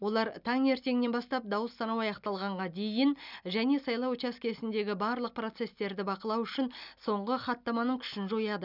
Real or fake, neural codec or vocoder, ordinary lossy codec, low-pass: real; none; none; 5.4 kHz